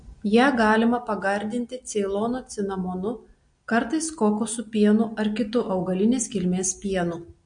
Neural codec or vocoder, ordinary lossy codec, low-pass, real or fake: none; MP3, 48 kbps; 9.9 kHz; real